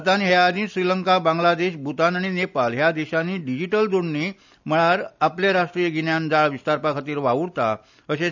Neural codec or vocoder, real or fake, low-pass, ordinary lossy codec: none; real; 7.2 kHz; none